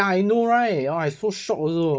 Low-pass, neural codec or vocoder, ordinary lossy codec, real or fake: none; codec, 16 kHz, 16 kbps, FunCodec, trained on Chinese and English, 50 frames a second; none; fake